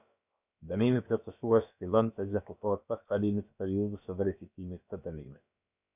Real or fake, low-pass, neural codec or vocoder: fake; 3.6 kHz; codec, 16 kHz, about 1 kbps, DyCAST, with the encoder's durations